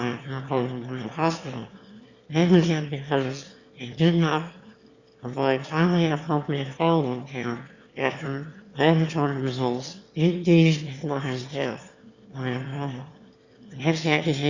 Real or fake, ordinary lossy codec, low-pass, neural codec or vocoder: fake; Opus, 64 kbps; 7.2 kHz; autoencoder, 22.05 kHz, a latent of 192 numbers a frame, VITS, trained on one speaker